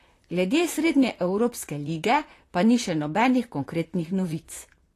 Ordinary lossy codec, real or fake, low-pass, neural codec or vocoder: AAC, 48 kbps; fake; 14.4 kHz; vocoder, 44.1 kHz, 128 mel bands, Pupu-Vocoder